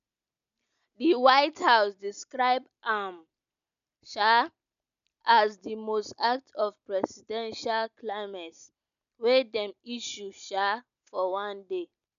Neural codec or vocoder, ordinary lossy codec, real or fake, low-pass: none; none; real; 7.2 kHz